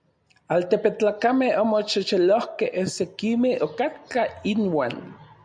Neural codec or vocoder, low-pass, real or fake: none; 9.9 kHz; real